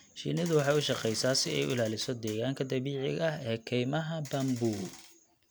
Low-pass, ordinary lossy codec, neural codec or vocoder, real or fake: none; none; none; real